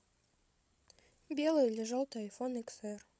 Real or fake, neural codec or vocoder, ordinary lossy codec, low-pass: fake; codec, 16 kHz, 16 kbps, FreqCodec, larger model; none; none